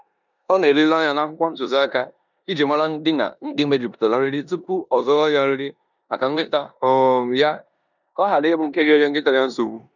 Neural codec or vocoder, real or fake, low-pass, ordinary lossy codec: codec, 16 kHz in and 24 kHz out, 0.9 kbps, LongCat-Audio-Codec, four codebook decoder; fake; 7.2 kHz; none